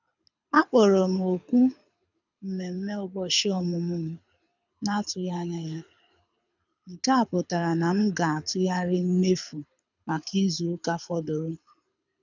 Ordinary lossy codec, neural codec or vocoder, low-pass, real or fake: none; codec, 24 kHz, 6 kbps, HILCodec; 7.2 kHz; fake